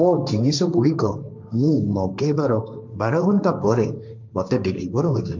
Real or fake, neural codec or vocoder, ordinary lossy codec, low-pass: fake; codec, 16 kHz, 1.1 kbps, Voila-Tokenizer; MP3, 64 kbps; 7.2 kHz